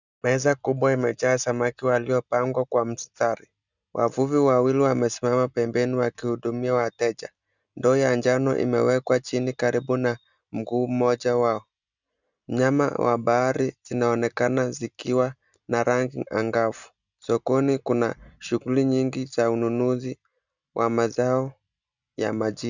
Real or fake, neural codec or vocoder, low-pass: real; none; 7.2 kHz